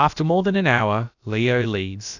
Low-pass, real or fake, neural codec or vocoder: 7.2 kHz; fake; codec, 16 kHz, about 1 kbps, DyCAST, with the encoder's durations